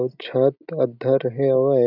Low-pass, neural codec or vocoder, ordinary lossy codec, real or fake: 5.4 kHz; none; none; real